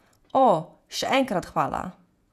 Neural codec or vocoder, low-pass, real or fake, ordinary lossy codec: vocoder, 48 kHz, 128 mel bands, Vocos; 14.4 kHz; fake; none